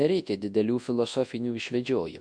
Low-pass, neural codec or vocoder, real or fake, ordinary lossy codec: 9.9 kHz; codec, 24 kHz, 0.9 kbps, WavTokenizer, large speech release; fake; MP3, 64 kbps